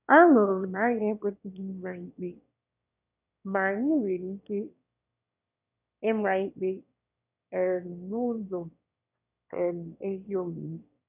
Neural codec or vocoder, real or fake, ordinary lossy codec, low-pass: autoencoder, 22.05 kHz, a latent of 192 numbers a frame, VITS, trained on one speaker; fake; none; 3.6 kHz